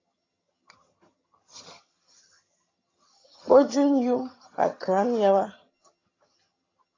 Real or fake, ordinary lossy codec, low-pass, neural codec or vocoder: fake; AAC, 32 kbps; 7.2 kHz; vocoder, 22.05 kHz, 80 mel bands, HiFi-GAN